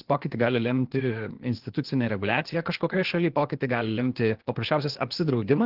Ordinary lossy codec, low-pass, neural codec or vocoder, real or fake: Opus, 16 kbps; 5.4 kHz; codec, 16 kHz, 0.8 kbps, ZipCodec; fake